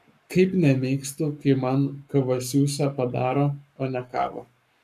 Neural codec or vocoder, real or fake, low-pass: codec, 44.1 kHz, 7.8 kbps, Pupu-Codec; fake; 14.4 kHz